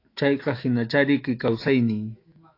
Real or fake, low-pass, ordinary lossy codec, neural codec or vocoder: fake; 5.4 kHz; AAC, 32 kbps; autoencoder, 48 kHz, 128 numbers a frame, DAC-VAE, trained on Japanese speech